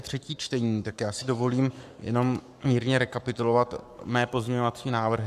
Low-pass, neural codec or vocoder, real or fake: 14.4 kHz; codec, 44.1 kHz, 7.8 kbps, Pupu-Codec; fake